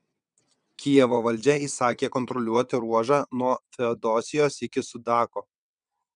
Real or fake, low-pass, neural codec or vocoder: fake; 9.9 kHz; vocoder, 22.05 kHz, 80 mel bands, WaveNeXt